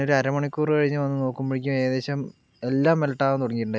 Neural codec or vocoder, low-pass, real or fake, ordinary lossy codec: none; none; real; none